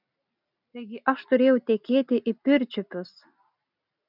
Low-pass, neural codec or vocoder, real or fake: 5.4 kHz; none; real